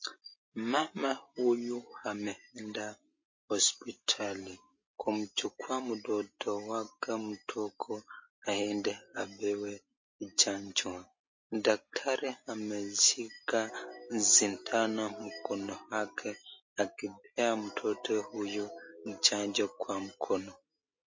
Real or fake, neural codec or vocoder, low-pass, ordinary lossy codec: real; none; 7.2 kHz; MP3, 32 kbps